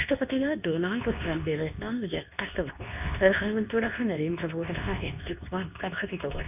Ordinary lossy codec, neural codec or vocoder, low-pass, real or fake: none; codec, 24 kHz, 0.9 kbps, WavTokenizer, medium speech release version 2; 3.6 kHz; fake